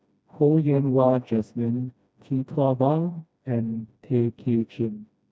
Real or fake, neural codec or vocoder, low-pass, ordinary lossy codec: fake; codec, 16 kHz, 1 kbps, FreqCodec, smaller model; none; none